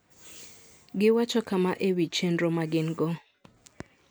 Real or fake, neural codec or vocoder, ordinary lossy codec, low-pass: real; none; none; none